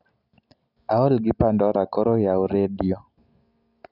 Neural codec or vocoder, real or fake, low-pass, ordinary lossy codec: none; real; 5.4 kHz; none